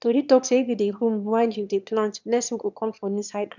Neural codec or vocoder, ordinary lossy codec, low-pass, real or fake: autoencoder, 22.05 kHz, a latent of 192 numbers a frame, VITS, trained on one speaker; none; 7.2 kHz; fake